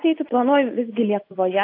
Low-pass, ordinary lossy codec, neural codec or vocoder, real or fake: 5.4 kHz; AAC, 32 kbps; none; real